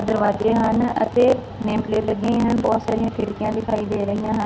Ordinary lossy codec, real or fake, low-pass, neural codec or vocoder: none; real; none; none